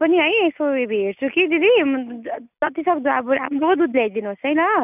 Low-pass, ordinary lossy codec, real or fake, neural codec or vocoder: 3.6 kHz; none; real; none